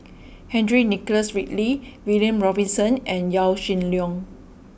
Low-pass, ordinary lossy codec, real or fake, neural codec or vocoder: none; none; real; none